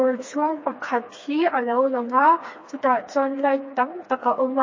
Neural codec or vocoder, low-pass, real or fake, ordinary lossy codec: codec, 16 kHz, 2 kbps, FreqCodec, smaller model; 7.2 kHz; fake; MP3, 32 kbps